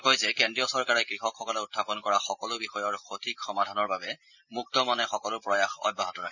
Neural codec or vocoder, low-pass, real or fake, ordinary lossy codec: none; 7.2 kHz; real; none